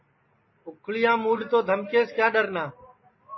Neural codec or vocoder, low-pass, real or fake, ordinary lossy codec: none; 7.2 kHz; real; MP3, 24 kbps